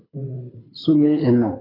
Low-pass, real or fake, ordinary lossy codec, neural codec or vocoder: 5.4 kHz; fake; AAC, 24 kbps; codec, 24 kHz, 6 kbps, HILCodec